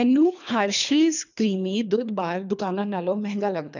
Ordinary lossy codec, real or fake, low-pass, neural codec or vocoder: none; fake; 7.2 kHz; codec, 24 kHz, 3 kbps, HILCodec